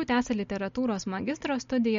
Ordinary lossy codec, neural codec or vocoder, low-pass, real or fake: MP3, 48 kbps; none; 7.2 kHz; real